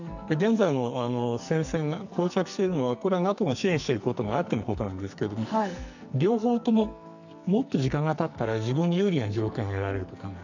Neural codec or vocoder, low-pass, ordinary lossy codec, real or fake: codec, 44.1 kHz, 2.6 kbps, SNAC; 7.2 kHz; none; fake